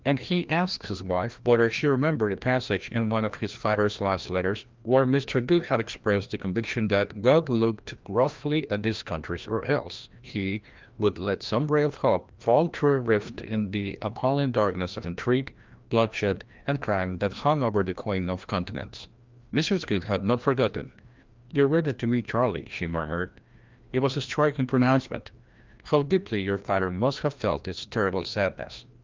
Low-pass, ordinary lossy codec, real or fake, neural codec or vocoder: 7.2 kHz; Opus, 24 kbps; fake; codec, 16 kHz, 1 kbps, FreqCodec, larger model